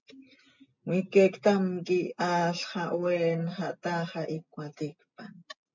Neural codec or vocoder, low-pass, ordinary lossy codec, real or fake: none; 7.2 kHz; MP3, 64 kbps; real